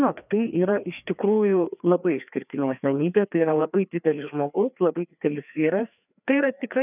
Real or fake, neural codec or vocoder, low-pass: fake; codec, 16 kHz, 2 kbps, FreqCodec, larger model; 3.6 kHz